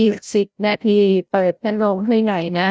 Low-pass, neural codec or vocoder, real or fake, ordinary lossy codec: none; codec, 16 kHz, 0.5 kbps, FreqCodec, larger model; fake; none